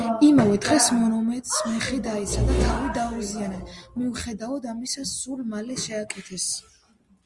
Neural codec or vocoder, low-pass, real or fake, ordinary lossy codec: none; 10.8 kHz; real; Opus, 32 kbps